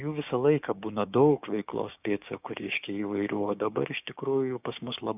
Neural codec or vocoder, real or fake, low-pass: codec, 16 kHz, 6 kbps, DAC; fake; 3.6 kHz